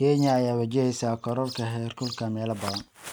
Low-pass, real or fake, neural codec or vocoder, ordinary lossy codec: none; real; none; none